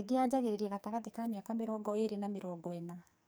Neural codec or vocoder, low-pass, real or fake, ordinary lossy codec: codec, 44.1 kHz, 2.6 kbps, SNAC; none; fake; none